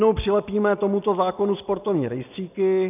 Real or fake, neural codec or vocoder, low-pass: real; none; 3.6 kHz